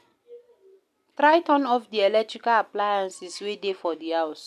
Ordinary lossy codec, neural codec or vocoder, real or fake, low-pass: none; none; real; 14.4 kHz